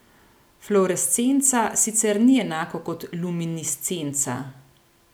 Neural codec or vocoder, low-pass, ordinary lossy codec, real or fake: none; none; none; real